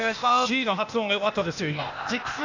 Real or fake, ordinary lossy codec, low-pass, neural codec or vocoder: fake; none; 7.2 kHz; codec, 16 kHz, 0.8 kbps, ZipCodec